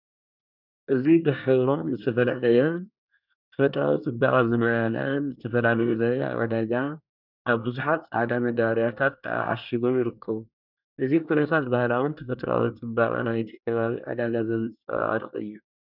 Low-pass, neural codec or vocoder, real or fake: 5.4 kHz; codec, 24 kHz, 1 kbps, SNAC; fake